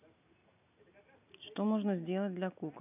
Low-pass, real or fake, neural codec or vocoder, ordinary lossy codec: 3.6 kHz; real; none; none